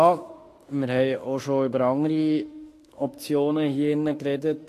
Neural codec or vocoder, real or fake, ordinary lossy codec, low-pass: autoencoder, 48 kHz, 32 numbers a frame, DAC-VAE, trained on Japanese speech; fake; AAC, 48 kbps; 14.4 kHz